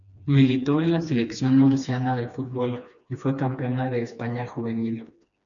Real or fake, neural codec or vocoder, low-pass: fake; codec, 16 kHz, 2 kbps, FreqCodec, smaller model; 7.2 kHz